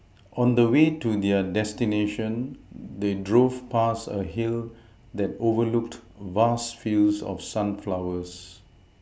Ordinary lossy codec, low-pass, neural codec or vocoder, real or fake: none; none; none; real